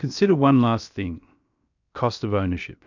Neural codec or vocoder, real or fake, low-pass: codec, 16 kHz, 0.7 kbps, FocalCodec; fake; 7.2 kHz